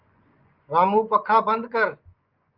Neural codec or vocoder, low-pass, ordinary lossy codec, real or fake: vocoder, 24 kHz, 100 mel bands, Vocos; 5.4 kHz; Opus, 24 kbps; fake